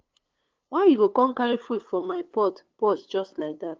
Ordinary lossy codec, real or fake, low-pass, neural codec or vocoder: Opus, 24 kbps; fake; 7.2 kHz; codec, 16 kHz, 2 kbps, FunCodec, trained on LibriTTS, 25 frames a second